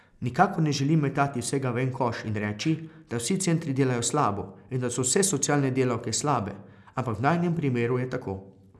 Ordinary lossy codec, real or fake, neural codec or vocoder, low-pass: none; real; none; none